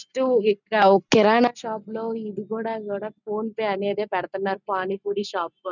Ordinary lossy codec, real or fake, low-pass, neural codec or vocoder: none; real; 7.2 kHz; none